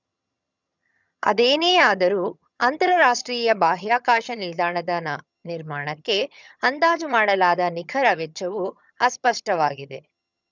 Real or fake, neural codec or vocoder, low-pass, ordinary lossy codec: fake; vocoder, 22.05 kHz, 80 mel bands, HiFi-GAN; 7.2 kHz; none